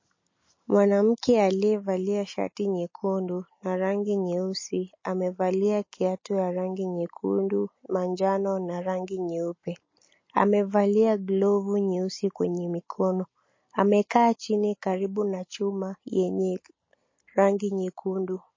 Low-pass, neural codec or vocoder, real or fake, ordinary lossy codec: 7.2 kHz; none; real; MP3, 32 kbps